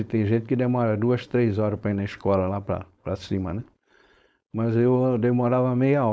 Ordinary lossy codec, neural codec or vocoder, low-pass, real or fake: none; codec, 16 kHz, 4.8 kbps, FACodec; none; fake